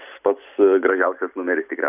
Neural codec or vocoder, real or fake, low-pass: none; real; 3.6 kHz